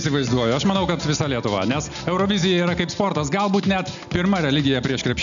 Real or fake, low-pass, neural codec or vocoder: real; 7.2 kHz; none